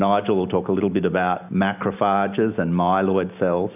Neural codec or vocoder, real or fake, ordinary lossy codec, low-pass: none; real; AAC, 32 kbps; 3.6 kHz